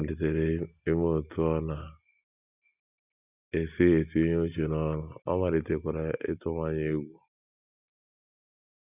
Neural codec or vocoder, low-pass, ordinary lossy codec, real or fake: none; 3.6 kHz; none; real